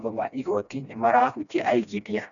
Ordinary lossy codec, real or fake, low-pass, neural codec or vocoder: none; fake; 7.2 kHz; codec, 16 kHz, 1 kbps, FreqCodec, smaller model